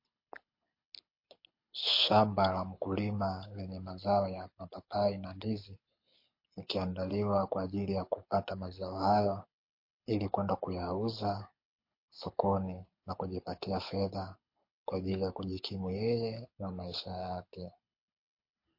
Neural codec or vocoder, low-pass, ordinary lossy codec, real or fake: codec, 24 kHz, 6 kbps, HILCodec; 5.4 kHz; MP3, 32 kbps; fake